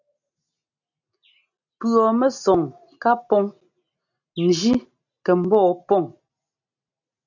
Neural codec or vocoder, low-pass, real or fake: none; 7.2 kHz; real